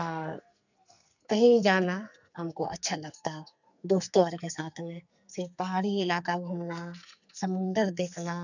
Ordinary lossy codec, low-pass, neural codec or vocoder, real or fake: none; 7.2 kHz; codec, 44.1 kHz, 2.6 kbps, SNAC; fake